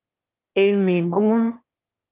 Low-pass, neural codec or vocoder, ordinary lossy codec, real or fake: 3.6 kHz; autoencoder, 22.05 kHz, a latent of 192 numbers a frame, VITS, trained on one speaker; Opus, 24 kbps; fake